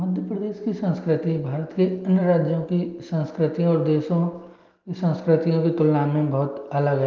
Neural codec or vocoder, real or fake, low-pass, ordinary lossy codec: none; real; 7.2 kHz; Opus, 32 kbps